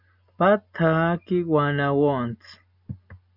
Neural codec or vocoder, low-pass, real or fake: none; 5.4 kHz; real